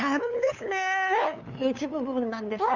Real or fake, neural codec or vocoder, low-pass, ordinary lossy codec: fake; codec, 16 kHz, 8 kbps, FunCodec, trained on LibriTTS, 25 frames a second; 7.2 kHz; none